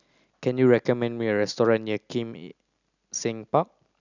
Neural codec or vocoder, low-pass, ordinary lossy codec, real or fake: none; 7.2 kHz; none; real